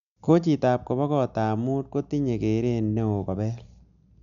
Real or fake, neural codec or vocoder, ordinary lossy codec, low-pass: real; none; none; 7.2 kHz